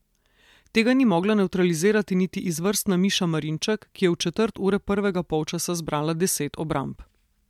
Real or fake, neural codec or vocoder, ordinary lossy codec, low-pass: real; none; MP3, 96 kbps; 19.8 kHz